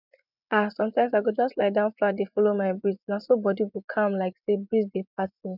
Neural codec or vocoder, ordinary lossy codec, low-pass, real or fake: vocoder, 24 kHz, 100 mel bands, Vocos; none; 5.4 kHz; fake